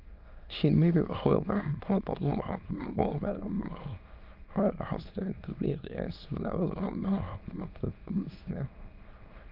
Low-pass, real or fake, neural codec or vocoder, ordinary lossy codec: 5.4 kHz; fake; autoencoder, 22.05 kHz, a latent of 192 numbers a frame, VITS, trained on many speakers; Opus, 32 kbps